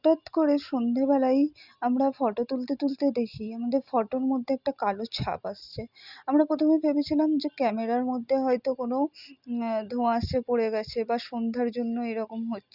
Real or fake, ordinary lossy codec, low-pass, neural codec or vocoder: real; Opus, 64 kbps; 5.4 kHz; none